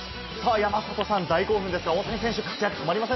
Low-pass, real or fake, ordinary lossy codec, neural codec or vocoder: 7.2 kHz; real; MP3, 24 kbps; none